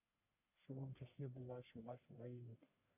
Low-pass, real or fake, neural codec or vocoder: 3.6 kHz; fake; codec, 44.1 kHz, 1.7 kbps, Pupu-Codec